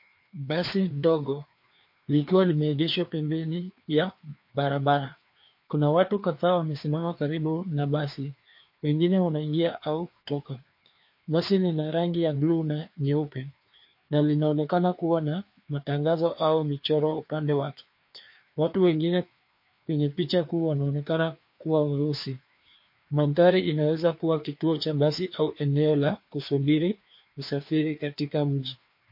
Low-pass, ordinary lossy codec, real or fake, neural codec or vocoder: 5.4 kHz; MP3, 32 kbps; fake; codec, 16 kHz, 2 kbps, FreqCodec, larger model